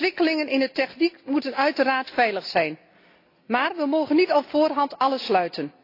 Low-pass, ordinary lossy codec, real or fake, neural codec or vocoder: 5.4 kHz; AAC, 32 kbps; real; none